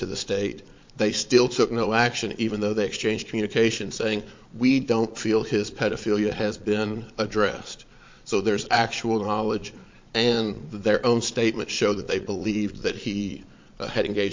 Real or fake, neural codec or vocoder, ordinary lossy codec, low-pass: fake; vocoder, 22.05 kHz, 80 mel bands, Vocos; MP3, 48 kbps; 7.2 kHz